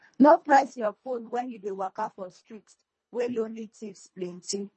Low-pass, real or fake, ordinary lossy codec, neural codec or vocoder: 10.8 kHz; fake; MP3, 32 kbps; codec, 24 kHz, 1.5 kbps, HILCodec